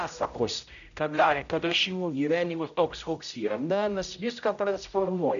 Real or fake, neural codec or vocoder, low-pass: fake; codec, 16 kHz, 0.5 kbps, X-Codec, HuBERT features, trained on general audio; 7.2 kHz